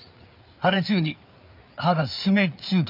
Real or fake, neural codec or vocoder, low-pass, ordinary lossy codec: fake; codec, 16 kHz, 4 kbps, FreqCodec, larger model; 5.4 kHz; none